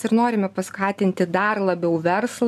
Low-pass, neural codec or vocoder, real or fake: 14.4 kHz; none; real